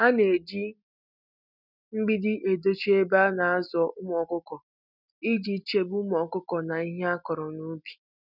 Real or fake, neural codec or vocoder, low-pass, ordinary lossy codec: real; none; 5.4 kHz; none